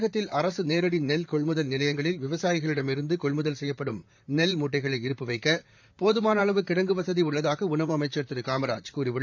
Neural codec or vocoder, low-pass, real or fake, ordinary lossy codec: vocoder, 44.1 kHz, 128 mel bands, Pupu-Vocoder; 7.2 kHz; fake; MP3, 64 kbps